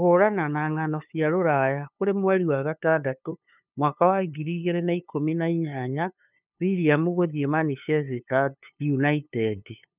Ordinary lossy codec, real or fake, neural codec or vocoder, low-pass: none; fake; codec, 16 kHz, 2 kbps, FunCodec, trained on LibriTTS, 25 frames a second; 3.6 kHz